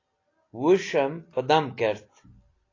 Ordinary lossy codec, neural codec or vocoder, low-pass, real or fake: AAC, 32 kbps; none; 7.2 kHz; real